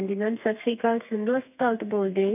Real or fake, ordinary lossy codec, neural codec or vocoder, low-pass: fake; none; codec, 32 kHz, 1.9 kbps, SNAC; 3.6 kHz